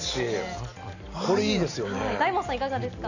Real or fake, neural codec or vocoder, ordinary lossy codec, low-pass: real; none; none; 7.2 kHz